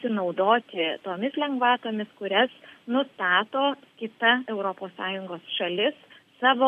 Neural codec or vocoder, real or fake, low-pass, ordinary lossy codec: none; real; 14.4 kHz; MP3, 64 kbps